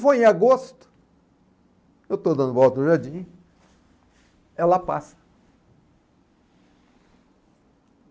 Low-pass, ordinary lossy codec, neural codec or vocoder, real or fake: none; none; none; real